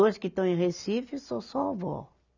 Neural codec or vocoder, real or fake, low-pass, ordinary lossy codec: none; real; 7.2 kHz; none